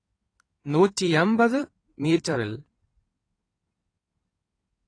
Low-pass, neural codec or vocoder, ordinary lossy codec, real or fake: 9.9 kHz; codec, 16 kHz in and 24 kHz out, 2.2 kbps, FireRedTTS-2 codec; AAC, 32 kbps; fake